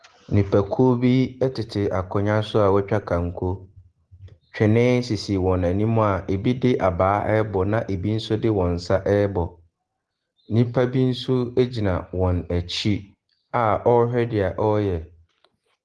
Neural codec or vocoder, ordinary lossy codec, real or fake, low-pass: none; Opus, 16 kbps; real; 10.8 kHz